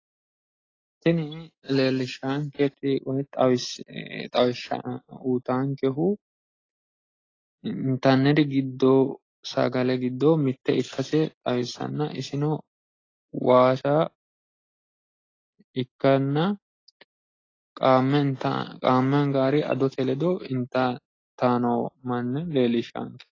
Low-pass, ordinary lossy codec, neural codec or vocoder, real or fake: 7.2 kHz; AAC, 32 kbps; none; real